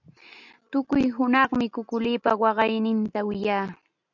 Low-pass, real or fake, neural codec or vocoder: 7.2 kHz; real; none